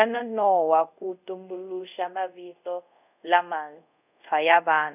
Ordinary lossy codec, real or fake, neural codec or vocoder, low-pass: none; fake; codec, 24 kHz, 0.5 kbps, DualCodec; 3.6 kHz